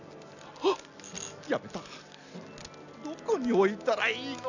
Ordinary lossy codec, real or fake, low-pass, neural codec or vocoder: none; real; 7.2 kHz; none